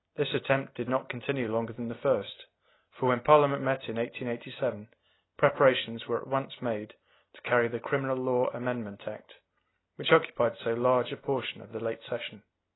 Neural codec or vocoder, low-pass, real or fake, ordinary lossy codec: none; 7.2 kHz; real; AAC, 16 kbps